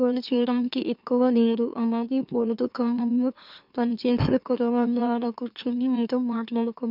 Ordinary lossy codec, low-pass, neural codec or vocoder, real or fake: none; 5.4 kHz; autoencoder, 44.1 kHz, a latent of 192 numbers a frame, MeloTTS; fake